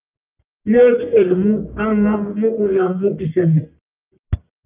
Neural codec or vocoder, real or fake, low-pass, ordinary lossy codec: codec, 44.1 kHz, 1.7 kbps, Pupu-Codec; fake; 3.6 kHz; Opus, 24 kbps